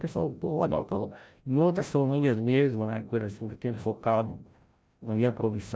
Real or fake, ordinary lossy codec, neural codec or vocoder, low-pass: fake; none; codec, 16 kHz, 0.5 kbps, FreqCodec, larger model; none